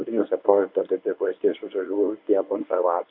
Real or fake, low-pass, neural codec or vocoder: fake; 5.4 kHz; codec, 24 kHz, 0.9 kbps, WavTokenizer, medium speech release version 1